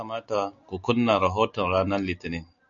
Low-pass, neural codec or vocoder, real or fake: 7.2 kHz; none; real